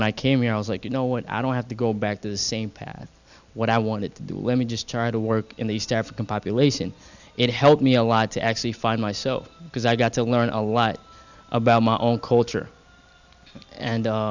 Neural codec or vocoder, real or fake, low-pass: none; real; 7.2 kHz